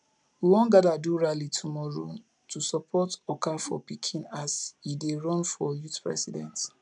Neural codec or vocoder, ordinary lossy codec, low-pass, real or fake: none; none; 10.8 kHz; real